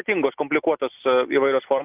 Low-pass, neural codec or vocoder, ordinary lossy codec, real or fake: 3.6 kHz; none; Opus, 32 kbps; real